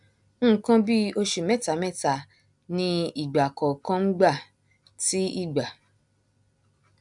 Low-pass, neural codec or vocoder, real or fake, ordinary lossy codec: 10.8 kHz; none; real; none